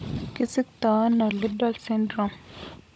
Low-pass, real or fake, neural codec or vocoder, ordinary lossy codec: none; fake; codec, 16 kHz, 16 kbps, FunCodec, trained on Chinese and English, 50 frames a second; none